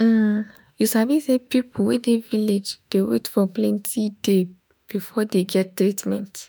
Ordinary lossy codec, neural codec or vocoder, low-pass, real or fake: none; autoencoder, 48 kHz, 32 numbers a frame, DAC-VAE, trained on Japanese speech; none; fake